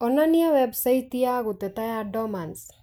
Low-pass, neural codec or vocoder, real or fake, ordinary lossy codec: none; none; real; none